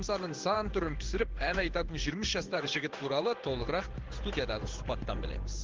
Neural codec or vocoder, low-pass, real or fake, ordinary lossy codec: codec, 16 kHz in and 24 kHz out, 1 kbps, XY-Tokenizer; 7.2 kHz; fake; Opus, 16 kbps